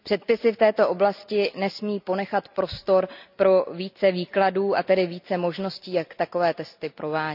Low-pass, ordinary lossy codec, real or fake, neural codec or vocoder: 5.4 kHz; none; real; none